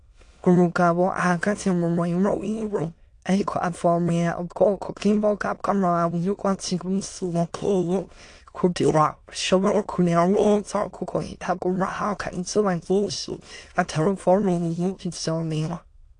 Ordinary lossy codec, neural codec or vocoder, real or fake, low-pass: AAC, 48 kbps; autoencoder, 22.05 kHz, a latent of 192 numbers a frame, VITS, trained on many speakers; fake; 9.9 kHz